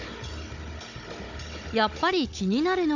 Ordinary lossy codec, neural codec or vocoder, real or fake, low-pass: none; codec, 16 kHz, 16 kbps, FunCodec, trained on Chinese and English, 50 frames a second; fake; 7.2 kHz